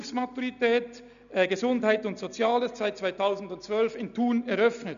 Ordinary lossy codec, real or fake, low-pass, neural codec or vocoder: none; real; 7.2 kHz; none